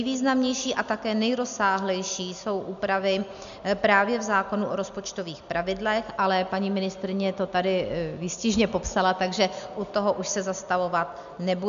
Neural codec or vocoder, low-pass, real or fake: none; 7.2 kHz; real